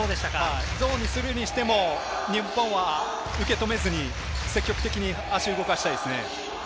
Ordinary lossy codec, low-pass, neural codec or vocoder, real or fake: none; none; none; real